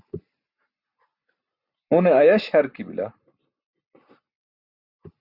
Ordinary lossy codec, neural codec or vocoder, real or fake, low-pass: Opus, 64 kbps; none; real; 5.4 kHz